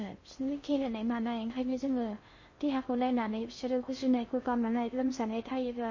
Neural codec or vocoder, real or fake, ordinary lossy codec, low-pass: codec, 16 kHz in and 24 kHz out, 0.6 kbps, FocalCodec, streaming, 4096 codes; fake; MP3, 32 kbps; 7.2 kHz